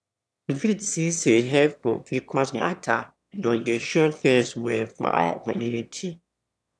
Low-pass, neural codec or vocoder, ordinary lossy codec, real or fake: none; autoencoder, 22.05 kHz, a latent of 192 numbers a frame, VITS, trained on one speaker; none; fake